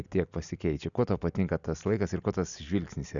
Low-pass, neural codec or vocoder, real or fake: 7.2 kHz; none; real